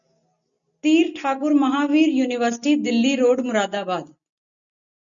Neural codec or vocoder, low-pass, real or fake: none; 7.2 kHz; real